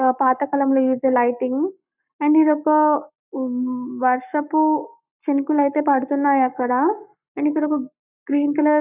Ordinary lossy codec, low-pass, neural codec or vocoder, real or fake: none; 3.6 kHz; codec, 44.1 kHz, 7.8 kbps, Pupu-Codec; fake